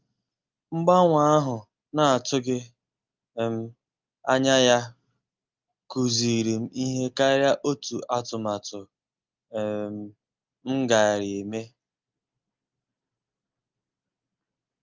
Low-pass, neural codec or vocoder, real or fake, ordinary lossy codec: 7.2 kHz; none; real; Opus, 24 kbps